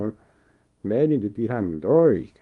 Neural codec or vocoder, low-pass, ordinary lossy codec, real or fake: codec, 24 kHz, 0.9 kbps, WavTokenizer, small release; 10.8 kHz; Opus, 32 kbps; fake